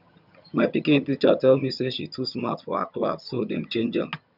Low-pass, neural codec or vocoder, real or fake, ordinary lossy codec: 5.4 kHz; vocoder, 22.05 kHz, 80 mel bands, HiFi-GAN; fake; none